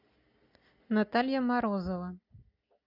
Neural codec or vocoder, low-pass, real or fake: none; 5.4 kHz; real